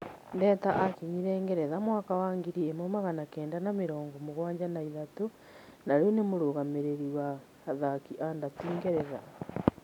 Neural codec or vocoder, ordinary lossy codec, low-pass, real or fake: none; none; 19.8 kHz; real